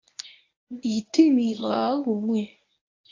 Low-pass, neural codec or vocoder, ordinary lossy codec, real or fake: 7.2 kHz; codec, 24 kHz, 0.9 kbps, WavTokenizer, medium speech release version 1; AAC, 32 kbps; fake